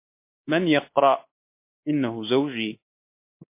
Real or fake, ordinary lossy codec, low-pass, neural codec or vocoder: fake; MP3, 24 kbps; 3.6 kHz; codec, 24 kHz, 3.1 kbps, DualCodec